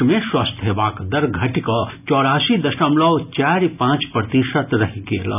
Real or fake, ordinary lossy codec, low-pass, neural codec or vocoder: real; none; 3.6 kHz; none